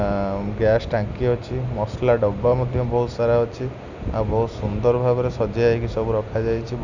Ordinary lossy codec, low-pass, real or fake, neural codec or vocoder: none; 7.2 kHz; real; none